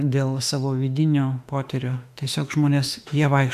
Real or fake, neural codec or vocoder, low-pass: fake; autoencoder, 48 kHz, 32 numbers a frame, DAC-VAE, trained on Japanese speech; 14.4 kHz